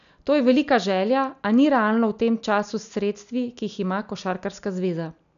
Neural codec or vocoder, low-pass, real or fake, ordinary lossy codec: none; 7.2 kHz; real; none